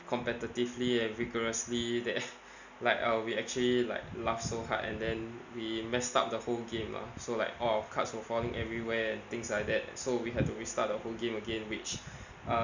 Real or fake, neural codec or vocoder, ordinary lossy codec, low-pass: real; none; none; 7.2 kHz